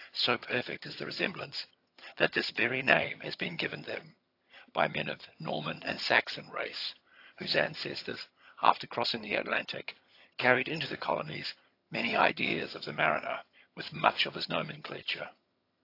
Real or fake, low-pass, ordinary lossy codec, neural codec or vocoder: fake; 5.4 kHz; AAC, 32 kbps; vocoder, 22.05 kHz, 80 mel bands, HiFi-GAN